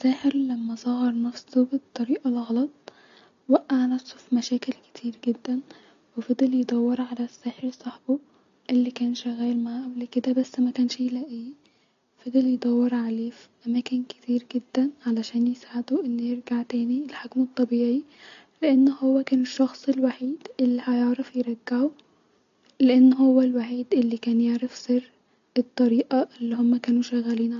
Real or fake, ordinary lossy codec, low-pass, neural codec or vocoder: real; AAC, 64 kbps; 7.2 kHz; none